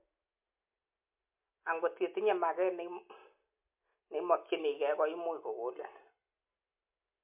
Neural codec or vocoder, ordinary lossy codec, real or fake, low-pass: none; MP3, 32 kbps; real; 3.6 kHz